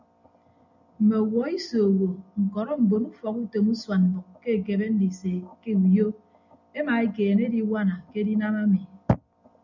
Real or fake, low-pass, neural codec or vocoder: real; 7.2 kHz; none